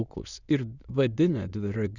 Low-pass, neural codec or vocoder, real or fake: 7.2 kHz; autoencoder, 22.05 kHz, a latent of 192 numbers a frame, VITS, trained on many speakers; fake